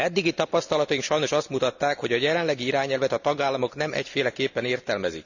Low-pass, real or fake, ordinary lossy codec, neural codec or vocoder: 7.2 kHz; real; none; none